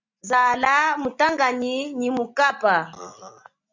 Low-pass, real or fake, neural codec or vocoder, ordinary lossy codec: 7.2 kHz; real; none; MP3, 64 kbps